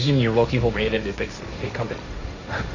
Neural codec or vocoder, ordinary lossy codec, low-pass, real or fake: codec, 16 kHz, 1.1 kbps, Voila-Tokenizer; Opus, 64 kbps; 7.2 kHz; fake